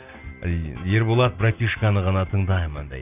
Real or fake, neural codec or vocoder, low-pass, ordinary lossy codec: real; none; 3.6 kHz; none